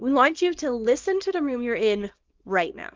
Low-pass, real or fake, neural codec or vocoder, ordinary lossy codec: 7.2 kHz; fake; codec, 24 kHz, 0.9 kbps, WavTokenizer, small release; Opus, 24 kbps